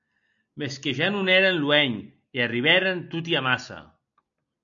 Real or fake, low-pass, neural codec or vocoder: real; 7.2 kHz; none